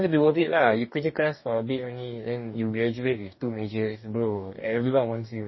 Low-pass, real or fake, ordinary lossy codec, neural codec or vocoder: 7.2 kHz; fake; MP3, 24 kbps; codec, 44.1 kHz, 2.6 kbps, DAC